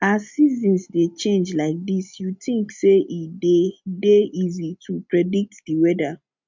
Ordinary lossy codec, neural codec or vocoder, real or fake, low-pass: MP3, 64 kbps; none; real; 7.2 kHz